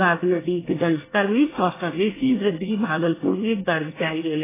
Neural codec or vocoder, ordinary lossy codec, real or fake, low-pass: codec, 24 kHz, 1 kbps, SNAC; AAC, 16 kbps; fake; 3.6 kHz